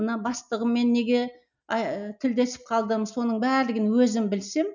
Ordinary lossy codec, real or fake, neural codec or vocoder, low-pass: none; real; none; 7.2 kHz